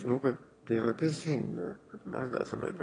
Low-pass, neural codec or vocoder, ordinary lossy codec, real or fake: 9.9 kHz; autoencoder, 22.05 kHz, a latent of 192 numbers a frame, VITS, trained on one speaker; AAC, 32 kbps; fake